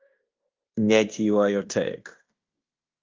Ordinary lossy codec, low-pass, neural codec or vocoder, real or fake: Opus, 24 kbps; 7.2 kHz; codec, 16 kHz in and 24 kHz out, 0.9 kbps, LongCat-Audio-Codec, fine tuned four codebook decoder; fake